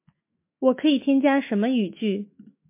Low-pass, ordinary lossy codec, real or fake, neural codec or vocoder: 3.6 kHz; MP3, 24 kbps; fake; autoencoder, 48 kHz, 128 numbers a frame, DAC-VAE, trained on Japanese speech